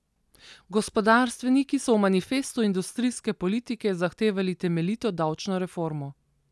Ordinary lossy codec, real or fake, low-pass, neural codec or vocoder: none; real; none; none